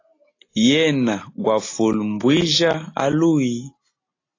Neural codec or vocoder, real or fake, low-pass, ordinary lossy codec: none; real; 7.2 kHz; AAC, 32 kbps